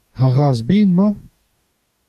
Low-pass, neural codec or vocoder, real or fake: 14.4 kHz; codec, 44.1 kHz, 2.6 kbps, DAC; fake